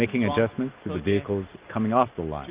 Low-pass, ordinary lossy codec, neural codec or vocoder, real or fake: 3.6 kHz; Opus, 32 kbps; none; real